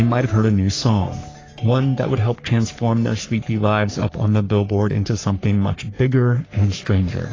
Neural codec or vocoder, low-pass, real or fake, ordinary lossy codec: codec, 44.1 kHz, 3.4 kbps, Pupu-Codec; 7.2 kHz; fake; AAC, 32 kbps